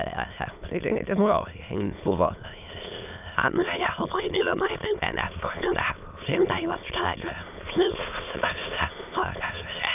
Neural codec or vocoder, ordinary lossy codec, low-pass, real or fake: autoencoder, 22.05 kHz, a latent of 192 numbers a frame, VITS, trained on many speakers; none; 3.6 kHz; fake